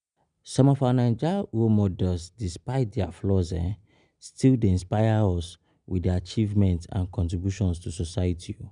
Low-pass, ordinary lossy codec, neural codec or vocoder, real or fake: 9.9 kHz; none; none; real